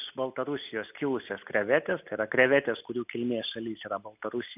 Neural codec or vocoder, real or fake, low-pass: none; real; 3.6 kHz